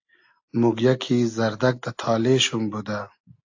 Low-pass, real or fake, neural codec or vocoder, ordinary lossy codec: 7.2 kHz; real; none; AAC, 48 kbps